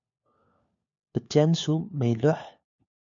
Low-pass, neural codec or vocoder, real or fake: 7.2 kHz; codec, 16 kHz, 4 kbps, FunCodec, trained on LibriTTS, 50 frames a second; fake